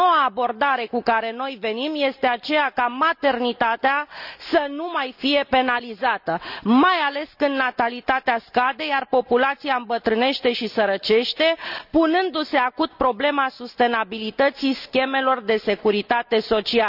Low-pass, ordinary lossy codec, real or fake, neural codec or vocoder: 5.4 kHz; none; real; none